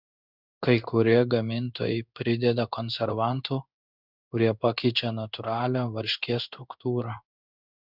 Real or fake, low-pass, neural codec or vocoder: fake; 5.4 kHz; codec, 16 kHz in and 24 kHz out, 1 kbps, XY-Tokenizer